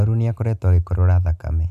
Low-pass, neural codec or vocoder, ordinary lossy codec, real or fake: 14.4 kHz; none; none; real